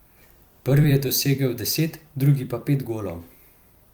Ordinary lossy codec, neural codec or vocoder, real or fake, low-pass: Opus, 32 kbps; vocoder, 44.1 kHz, 128 mel bands every 256 samples, BigVGAN v2; fake; 19.8 kHz